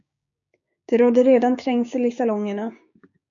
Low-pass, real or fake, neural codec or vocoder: 7.2 kHz; fake; codec, 16 kHz, 6 kbps, DAC